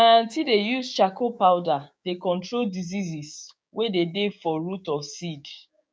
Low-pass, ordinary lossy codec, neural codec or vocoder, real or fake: none; none; codec, 16 kHz, 6 kbps, DAC; fake